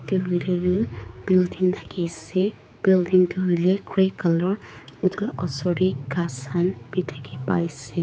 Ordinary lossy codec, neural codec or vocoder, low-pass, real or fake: none; codec, 16 kHz, 4 kbps, X-Codec, HuBERT features, trained on general audio; none; fake